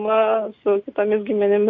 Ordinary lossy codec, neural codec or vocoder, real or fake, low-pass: MP3, 48 kbps; none; real; 7.2 kHz